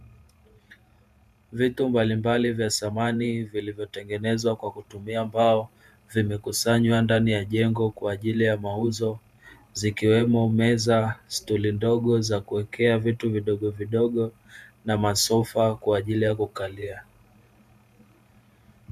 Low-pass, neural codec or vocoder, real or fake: 14.4 kHz; vocoder, 48 kHz, 128 mel bands, Vocos; fake